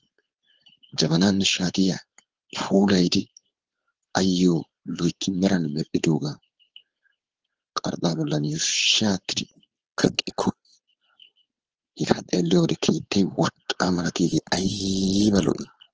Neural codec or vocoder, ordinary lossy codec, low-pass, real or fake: codec, 16 kHz, 4.8 kbps, FACodec; Opus, 16 kbps; 7.2 kHz; fake